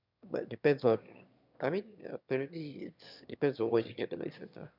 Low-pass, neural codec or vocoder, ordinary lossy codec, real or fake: 5.4 kHz; autoencoder, 22.05 kHz, a latent of 192 numbers a frame, VITS, trained on one speaker; none; fake